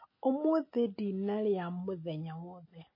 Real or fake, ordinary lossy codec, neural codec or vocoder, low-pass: real; MP3, 24 kbps; none; 5.4 kHz